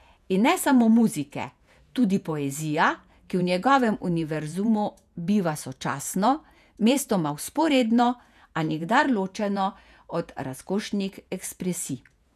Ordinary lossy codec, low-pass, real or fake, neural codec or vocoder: none; 14.4 kHz; fake; vocoder, 48 kHz, 128 mel bands, Vocos